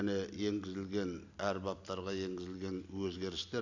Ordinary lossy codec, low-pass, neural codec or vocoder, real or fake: none; 7.2 kHz; none; real